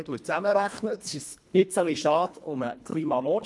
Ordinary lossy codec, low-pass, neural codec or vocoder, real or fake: none; none; codec, 24 kHz, 1.5 kbps, HILCodec; fake